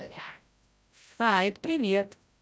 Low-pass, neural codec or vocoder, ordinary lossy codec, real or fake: none; codec, 16 kHz, 0.5 kbps, FreqCodec, larger model; none; fake